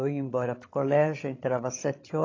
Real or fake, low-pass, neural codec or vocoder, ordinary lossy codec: fake; 7.2 kHz; codec, 16 kHz, 16 kbps, FreqCodec, larger model; AAC, 32 kbps